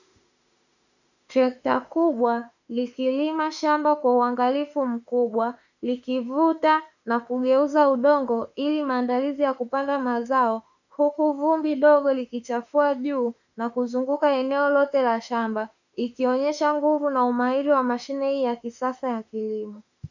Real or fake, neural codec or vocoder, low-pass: fake; autoencoder, 48 kHz, 32 numbers a frame, DAC-VAE, trained on Japanese speech; 7.2 kHz